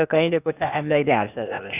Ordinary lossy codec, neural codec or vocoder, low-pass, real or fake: none; codec, 16 kHz, 0.8 kbps, ZipCodec; 3.6 kHz; fake